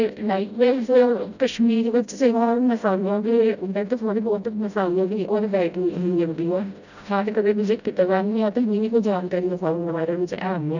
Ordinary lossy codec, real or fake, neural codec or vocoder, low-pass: none; fake; codec, 16 kHz, 0.5 kbps, FreqCodec, smaller model; 7.2 kHz